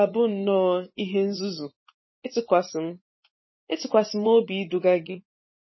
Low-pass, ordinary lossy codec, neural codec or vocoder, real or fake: 7.2 kHz; MP3, 24 kbps; vocoder, 24 kHz, 100 mel bands, Vocos; fake